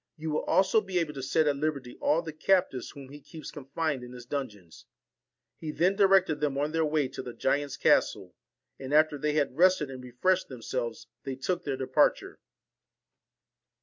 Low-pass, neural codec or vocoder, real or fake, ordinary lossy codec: 7.2 kHz; none; real; MP3, 64 kbps